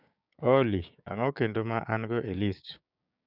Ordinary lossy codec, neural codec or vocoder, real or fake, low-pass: none; codec, 16 kHz, 6 kbps, DAC; fake; 5.4 kHz